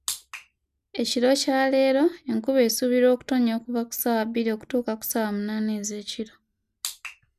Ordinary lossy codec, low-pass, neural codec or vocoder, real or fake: none; 14.4 kHz; none; real